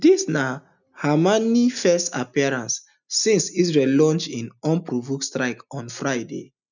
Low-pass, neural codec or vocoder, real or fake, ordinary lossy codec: 7.2 kHz; none; real; none